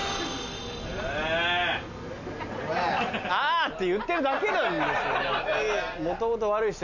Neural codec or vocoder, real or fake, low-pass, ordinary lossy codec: none; real; 7.2 kHz; none